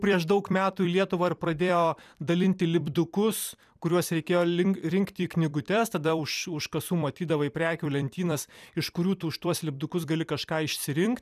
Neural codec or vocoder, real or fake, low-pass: vocoder, 44.1 kHz, 128 mel bands every 256 samples, BigVGAN v2; fake; 14.4 kHz